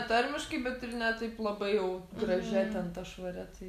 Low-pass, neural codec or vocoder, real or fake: 14.4 kHz; none; real